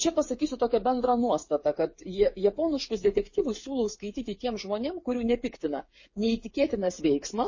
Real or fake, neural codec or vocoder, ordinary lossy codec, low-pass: fake; codec, 16 kHz, 8 kbps, FreqCodec, smaller model; MP3, 32 kbps; 7.2 kHz